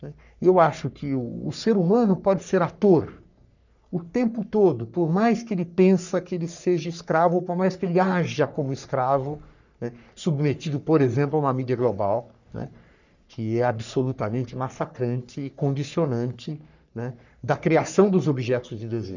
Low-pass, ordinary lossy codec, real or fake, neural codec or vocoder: 7.2 kHz; none; fake; codec, 44.1 kHz, 3.4 kbps, Pupu-Codec